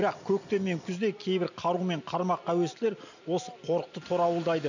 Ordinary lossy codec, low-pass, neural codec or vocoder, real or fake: none; 7.2 kHz; none; real